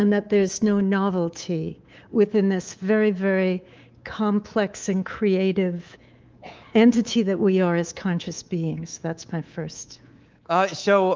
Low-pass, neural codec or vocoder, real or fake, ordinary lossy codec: 7.2 kHz; codec, 24 kHz, 3.1 kbps, DualCodec; fake; Opus, 24 kbps